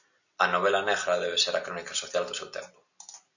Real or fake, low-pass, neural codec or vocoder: real; 7.2 kHz; none